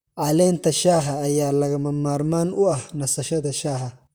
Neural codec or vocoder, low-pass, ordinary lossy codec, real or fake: vocoder, 44.1 kHz, 128 mel bands, Pupu-Vocoder; none; none; fake